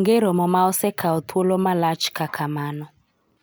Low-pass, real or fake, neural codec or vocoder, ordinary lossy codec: none; real; none; none